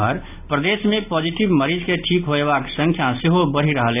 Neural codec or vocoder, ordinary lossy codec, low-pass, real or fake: none; none; 3.6 kHz; real